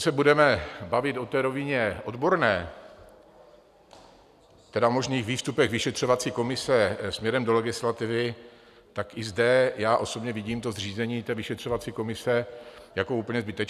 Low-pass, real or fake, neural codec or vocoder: 14.4 kHz; real; none